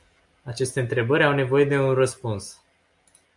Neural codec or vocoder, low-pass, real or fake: none; 10.8 kHz; real